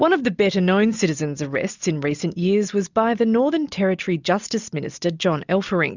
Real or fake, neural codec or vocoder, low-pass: real; none; 7.2 kHz